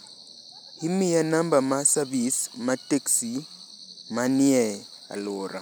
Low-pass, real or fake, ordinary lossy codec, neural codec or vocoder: none; real; none; none